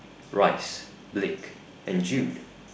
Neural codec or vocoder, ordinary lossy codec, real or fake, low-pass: none; none; real; none